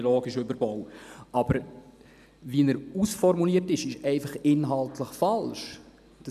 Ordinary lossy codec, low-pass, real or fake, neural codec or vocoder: none; 14.4 kHz; real; none